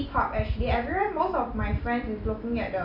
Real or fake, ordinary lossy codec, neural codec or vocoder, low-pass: real; none; none; 5.4 kHz